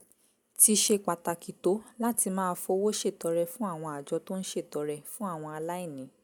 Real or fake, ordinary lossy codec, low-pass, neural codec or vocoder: real; none; none; none